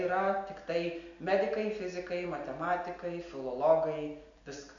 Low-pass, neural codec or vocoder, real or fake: 7.2 kHz; none; real